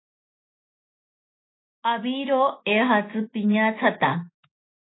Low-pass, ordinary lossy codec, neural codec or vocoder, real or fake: 7.2 kHz; AAC, 16 kbps; autoencoder, 48 kHz, 128 numbers a frame, DAC-VAE, trained on Japanese speech; fake